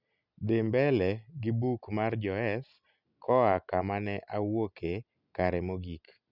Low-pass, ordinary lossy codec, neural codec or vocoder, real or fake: 5.4 kHz; none; none; real